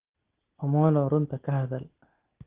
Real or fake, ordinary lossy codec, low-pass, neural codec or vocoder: real; Opus, 16 kbps; 3.6 kHz; none